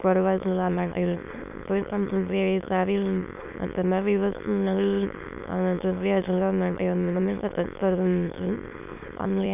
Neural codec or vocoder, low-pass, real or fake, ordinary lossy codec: autoencoder, 22.05 kHz, a latent of 192 numbers a frame, VITS, trained on many speakers; 3.6 kHz; fake; none